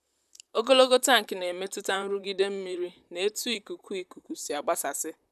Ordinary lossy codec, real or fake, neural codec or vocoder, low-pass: none; fake; vocoder, 44.1 kHz, 128 mel bands, Pupu-Vocoder; 14.4 kHz